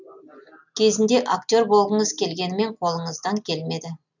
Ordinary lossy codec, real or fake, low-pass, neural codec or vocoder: none; real; 7.2 kHz; none